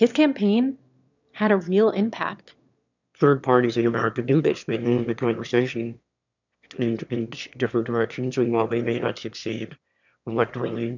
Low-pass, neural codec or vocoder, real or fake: 7.2 kHz; autoencoder, 22.05 kHz, a latent of 192 numbers a frame, VITS, trained on one speaker; fake